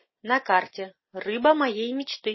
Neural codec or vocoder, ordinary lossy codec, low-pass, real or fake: none; MP3, 24 kbps; 7.2 kHz; real